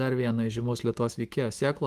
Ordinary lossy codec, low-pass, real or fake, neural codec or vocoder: Opus, 24 kbps; 14.4 kHz; fake; autoencoder, 48 kHz, 128 numbers a frame, DAC-VAE, trained on Japanese speech